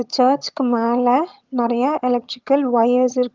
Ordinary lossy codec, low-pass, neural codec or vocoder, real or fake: Opus, 32 kbps; 7.2 kHz; vocoder, 22.05 kHz, 80 mel bands, HiFi-GAN; fake